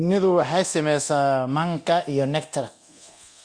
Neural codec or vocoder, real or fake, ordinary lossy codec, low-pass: codec, 24 kHz, 0.9 kbps, DualCodec; fake; Opus, 64 kbps; 9.9 kHz